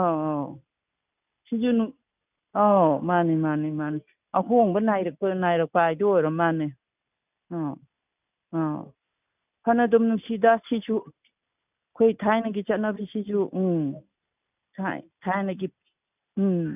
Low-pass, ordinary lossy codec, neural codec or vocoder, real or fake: 3.6 kHz; none; none; real